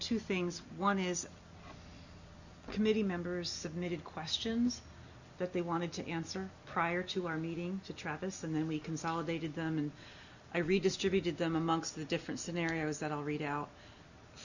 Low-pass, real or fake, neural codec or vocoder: 7.2 kHz; real; none